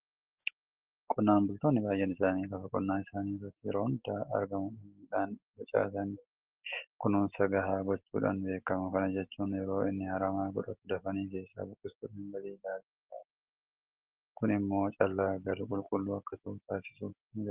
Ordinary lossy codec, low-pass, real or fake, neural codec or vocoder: Opus, 16 kbps; 3.6 kHz; real; none